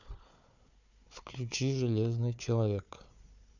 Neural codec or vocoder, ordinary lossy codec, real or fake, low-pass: codec, 16 kHz, 4 kbps, FunCodec, trained on Chinese and English, 50 frames a second; none; fake; 7.2 kHz